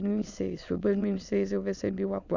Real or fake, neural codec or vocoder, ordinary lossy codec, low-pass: fake; autoencoder, 22.05 kHz, a latent of 192 numbers a frame, VITS, trained on many speakers; none; 7.2 kHz